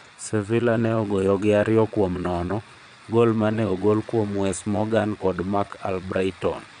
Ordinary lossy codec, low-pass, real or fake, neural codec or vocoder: none; 9.9 kHz; fake; vocoder, 22.05 kHz, 80 mel bands, Vocos